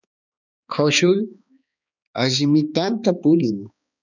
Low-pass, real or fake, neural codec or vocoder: 7.2 kHz; fake; codec, 16 kHz, 2 kbps, X-Codec, HuBERT features, trained on balanced general audio